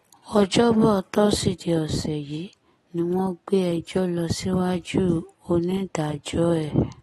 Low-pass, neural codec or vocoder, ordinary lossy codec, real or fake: 19.8 kHz; none; AAC, 32 kbps; real